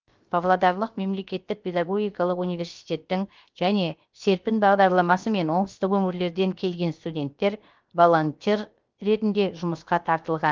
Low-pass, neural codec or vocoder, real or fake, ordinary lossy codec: 7.2 kHz; codec, 16 kHz, 0.7 kbps, FocalCodec; fake; Opus, 32 kbps